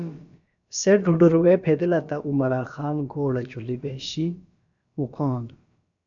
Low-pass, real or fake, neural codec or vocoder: 7.2 kHz; fake; codec, 16 kHz, about 1 kbps, DyCAST, with the encoder's durations